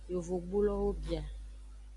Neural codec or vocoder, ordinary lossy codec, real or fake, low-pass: none; AAC, 48 kbps; real; 10.8 kHz